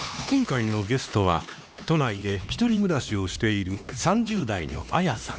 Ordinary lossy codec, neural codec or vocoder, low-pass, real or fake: none; codec, 16 kHz, 2 kbps, X-Codec, HuBERT features, trained on LibriSpeech; none; fake